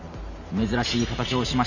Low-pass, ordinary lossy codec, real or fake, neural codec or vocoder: 7.2 kHz; none; fake; vocoder, 44.1 kHz, 128 mel bands every 512 samples, BigVGAN v2